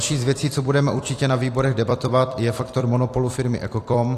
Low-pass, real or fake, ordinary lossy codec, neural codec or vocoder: 14.4 kHz; fake; AAC, 48 kbps; vocoder, 44.1 kHz, 128 mel bands every 256 samples, BigVGAN v2